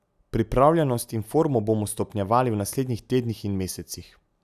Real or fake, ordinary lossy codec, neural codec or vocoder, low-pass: real; none; none; 14.4 kHz